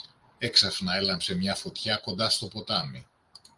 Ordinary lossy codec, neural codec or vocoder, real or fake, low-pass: Opus, 32 kbps; none; real; 10.8 kHz